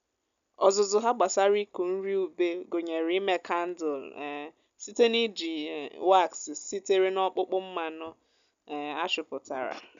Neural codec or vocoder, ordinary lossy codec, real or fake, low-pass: none; none; real; 7.2 kHz